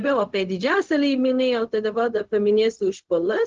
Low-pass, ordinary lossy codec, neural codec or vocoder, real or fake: 7.2 kHz; Opus, 16 kbps; codec, 16 kHz, 0.4 kbps, LongCat-Audio-Codec; fake